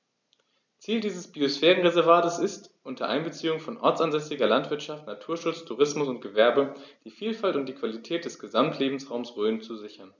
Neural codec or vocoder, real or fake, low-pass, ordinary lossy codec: none; real; 7.2 kHz; none